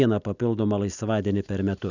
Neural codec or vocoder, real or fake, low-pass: none; real; 7.2 kHz